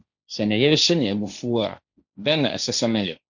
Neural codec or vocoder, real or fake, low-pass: codec, 16 kHz, 1.1 kbps, Voila-Tokenizer; fake; 7.2 kHz